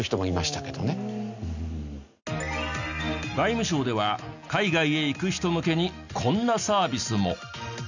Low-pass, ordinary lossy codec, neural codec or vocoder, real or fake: 7.2 kHz; none; none; real